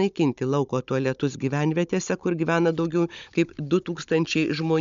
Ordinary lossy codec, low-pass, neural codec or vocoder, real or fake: MP3, 64 kbps; 7.2 kHz; codec, 16 kHz, 16 kbps, FunCodec, trained on Chinese and English, 50 frames a second; fake